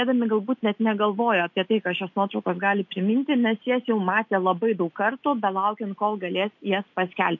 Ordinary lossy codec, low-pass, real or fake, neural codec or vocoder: MP3, 48 kbps; 7.2 kHz; real; none